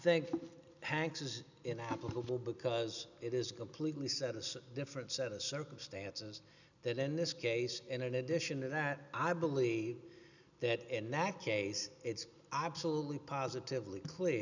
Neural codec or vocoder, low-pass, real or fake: none; 7.2 kHz; real